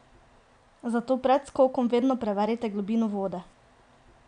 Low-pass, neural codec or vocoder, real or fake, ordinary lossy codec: 9.9 kHz; none; real; none